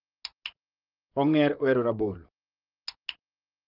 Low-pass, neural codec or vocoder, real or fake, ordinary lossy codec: 5.4 kHz; vocoder, 44.1 kHz, 128 mel bands, Pupu-Vocoder; fake; Opus, 32 kbps